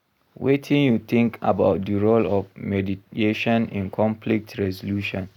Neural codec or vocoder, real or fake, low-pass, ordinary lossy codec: none; real; 19.8 kHz; none